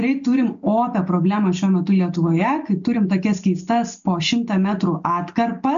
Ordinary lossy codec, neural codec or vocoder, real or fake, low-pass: MP3, 64 kbps; none; real; 7.2 kHz